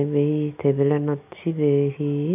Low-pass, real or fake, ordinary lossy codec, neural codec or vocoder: 3.6 kHz; real; none; none